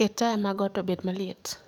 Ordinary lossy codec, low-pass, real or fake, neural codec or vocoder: none; 19.8 kHz; fake; codec, 44.1 kHz, 7.8 kbps, DAC